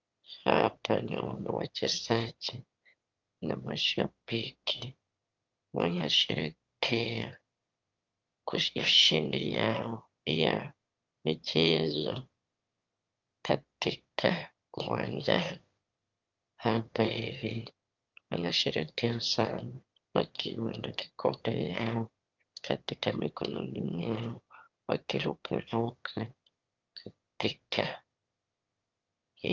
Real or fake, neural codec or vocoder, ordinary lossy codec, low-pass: fake; autoencoder, 22.05 kHz, a latent of 192 numbers a frame, VITS, trained on one speaker; Opus, 32 kbps; 7.2 kHz